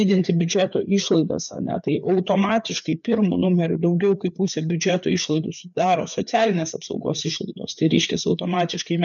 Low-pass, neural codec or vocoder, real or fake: 7.2 kHz; codec, 16 kHz, 4 kbps, FreqCodec, larger model; fake